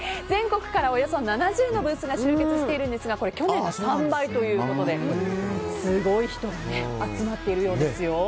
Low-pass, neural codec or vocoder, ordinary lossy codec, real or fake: none; none; none; real